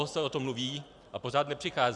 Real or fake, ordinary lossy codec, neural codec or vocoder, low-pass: real; Opus, 64 kbps; none; 10.8 kHz